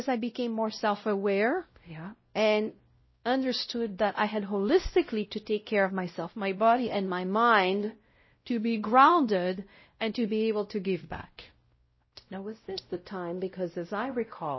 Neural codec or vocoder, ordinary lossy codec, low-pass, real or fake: codec, 16 kHz, 0.5 kbps, X-Codec, WavLM features, trained on Multilingual LibriSpeech; MP3, 24 kbps; 7.2 kHz; fake